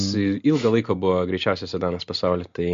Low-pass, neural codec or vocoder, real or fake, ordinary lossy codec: 7.2 kHz; none; real; MP3, 48 kbps